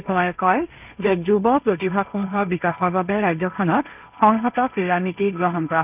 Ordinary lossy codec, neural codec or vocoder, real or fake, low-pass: none; codec, 16 kHz, 1.1 kbps, Voila-Tokenizer; fake; 3.6 kHz